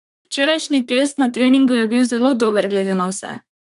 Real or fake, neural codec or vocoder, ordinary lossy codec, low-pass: fake; codec, 24 kHz, 1 kbps, SNAC; none; 10.8 kHz